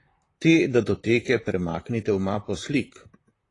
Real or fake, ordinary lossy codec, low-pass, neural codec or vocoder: fake; AAC, 32 kbps; 10.8 kHz; vocoder, 44.1 kHz, 128 mel bands, Pupu-Vocoder